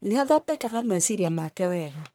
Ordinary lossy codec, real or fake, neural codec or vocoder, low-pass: none; fake; codec, 44.1 kHz, 1.7 kbps, Pupu-Codec; none